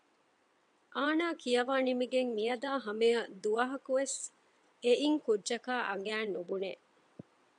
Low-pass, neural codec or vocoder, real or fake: 9.9 kHz; vocoder, 22.05 kHz, 80 mel bands, WaveNeXt; fake